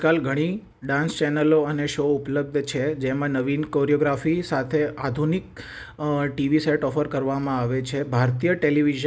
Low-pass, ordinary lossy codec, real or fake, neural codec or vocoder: none; none; real; none